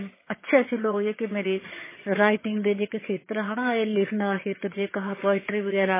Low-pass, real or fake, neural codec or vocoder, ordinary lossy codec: 3.6 kHz; fake; vocoder, 22.05 kHz, 80 mel bands, HiFi-GAN; MP3, 16 kbps